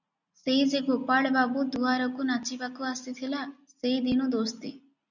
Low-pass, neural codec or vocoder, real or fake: 7.2 kHz; none; real